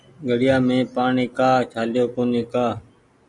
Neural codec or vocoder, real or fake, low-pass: none; real; 10.8 kHz